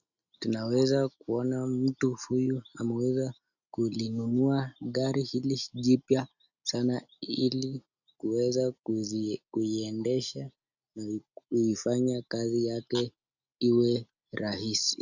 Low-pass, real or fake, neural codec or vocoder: 7.2 kHz; real; none